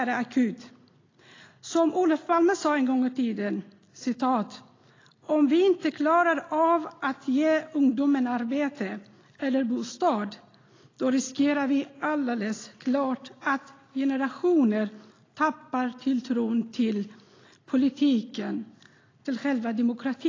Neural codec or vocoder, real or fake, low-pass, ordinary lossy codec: none; real; 7.2 kHz; AAC, 32 kbps